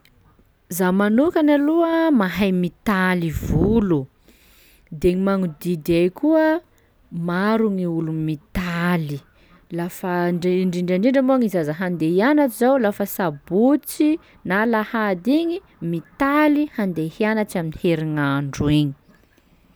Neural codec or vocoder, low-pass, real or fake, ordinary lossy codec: none; none; real; none